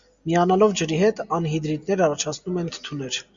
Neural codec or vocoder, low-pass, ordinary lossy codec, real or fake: none; 7.2 kHz; Opus, 64 kbps; real